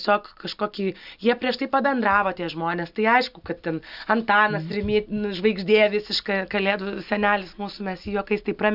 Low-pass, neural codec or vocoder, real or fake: 5.4 kHz; none; real